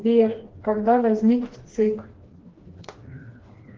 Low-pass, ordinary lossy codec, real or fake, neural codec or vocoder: 7.2 kHz; Opus, 16 kbps; fake; codec, 16 kHz, 2 kbps, FreqCodec, smaller model